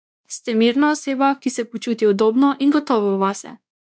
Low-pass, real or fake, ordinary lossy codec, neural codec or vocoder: none; fake; none; codec, 16 kHz, 2 kbps, X-Codec, WavLM features, trained on Multilingual LibriSpeech